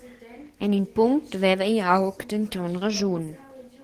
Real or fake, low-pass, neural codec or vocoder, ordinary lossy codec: fake; 14.4 kHz; codec, 44.1 kHz, 7.8 kbps, DAC; Opus, 16 kbps